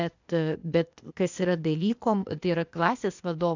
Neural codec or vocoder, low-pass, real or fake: codec, 16 kHz, 0.8 kbps, ZipCodec; 7.2 kHz; fake